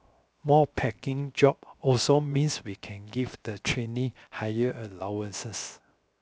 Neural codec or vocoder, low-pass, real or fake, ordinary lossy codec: codec, 16 kHz, 0.7 kbps, FocalCodec; none; fake; none